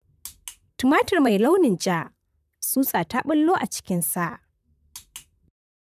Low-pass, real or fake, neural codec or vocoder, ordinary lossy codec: 14.4 kHz; fake; vocoder, 44.1 kHz, 128 mel bands, Pupu-Vocoder; none